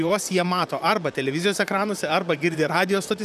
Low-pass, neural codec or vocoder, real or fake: 14.4 kHz; vocoder, 44.1 kHz, 128 mel bands, Pupu-Vocoder; fake